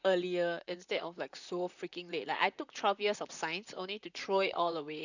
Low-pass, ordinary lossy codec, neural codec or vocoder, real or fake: 7.2 kHz; none; vocoder, 44.1 kHz, 128 mel bands, Pupu-Vocoder; fake